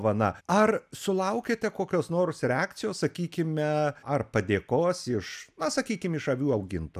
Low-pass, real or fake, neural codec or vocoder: 14.4 kHz; real; none